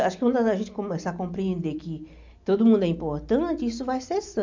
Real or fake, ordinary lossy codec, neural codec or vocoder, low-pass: real; MP3, 64 kbps; none; 7.2 kHz